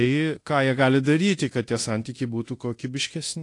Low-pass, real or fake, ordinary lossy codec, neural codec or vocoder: 10.8 kHz; fake; AAC, 48 kbps; codec, 24 kHz, 0.9 kbps, DualCodec